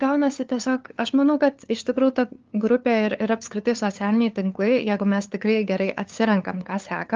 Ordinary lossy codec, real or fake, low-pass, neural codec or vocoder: Opus, 32 kbps; fake; 7.2 kHz; codec, 16 kHz, 2 kbps, FunCodec, trained on LibriTTS, 25 frames a second